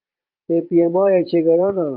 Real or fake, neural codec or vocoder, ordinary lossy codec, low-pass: fake; vocoder, 22.05 kHz, 80 mel bands, Vocos; Opus, 24 kbps; 5.4 kHz